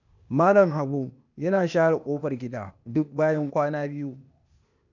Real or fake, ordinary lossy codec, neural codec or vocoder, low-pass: fake; none; codec, 16 kHz, 0.8 kbps, ZipCodec; 7.2 kHz